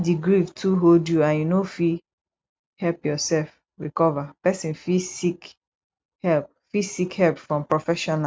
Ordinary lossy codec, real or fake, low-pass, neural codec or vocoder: none; real; none; none